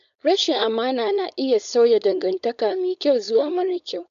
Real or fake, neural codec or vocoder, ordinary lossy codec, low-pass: fake; codec, 16 kHz, 4.8 kbps, FACodec; none; 7.2 kHz